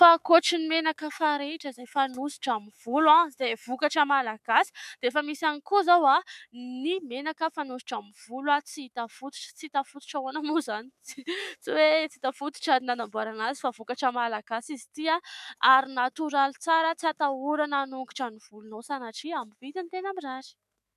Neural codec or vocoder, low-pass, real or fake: autoencoder, 48 kHz, 128 numbers a frame, DAC-VAE, trained on Japanese speech; 14.4 kHz; fake